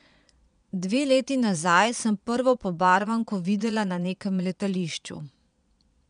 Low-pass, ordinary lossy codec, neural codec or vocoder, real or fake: 9.9 kHz; none; vocoder, 22.05 kHz, 80 mel bands, Vocos; fake